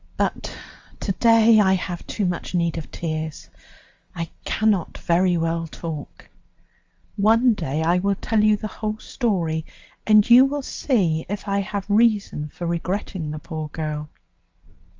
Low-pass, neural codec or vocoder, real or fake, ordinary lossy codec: 7.2 kHz; none; real; Opus, 32 kbps